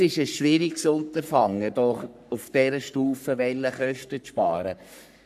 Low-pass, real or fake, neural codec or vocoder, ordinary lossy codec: 14.4 kHz; fake; codec, 44.1 kHz, 3.4 kbps, Pupu-Codec; none